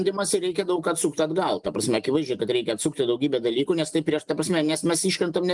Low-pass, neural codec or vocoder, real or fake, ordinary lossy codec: 10.8 kHz; none; real; Opus, 32 kbps